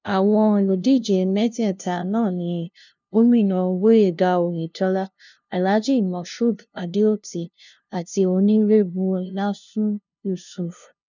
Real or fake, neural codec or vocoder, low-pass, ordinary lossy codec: fake; codec, 16 kHz, 0.5 kbps, FunCodec, trained on LibriTTS, 25 frames a second; 7.2 kHz; none